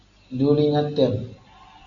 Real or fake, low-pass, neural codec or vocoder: real; 7.2 kHz; none